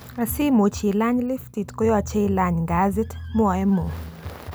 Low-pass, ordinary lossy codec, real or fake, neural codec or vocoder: none; none; real; none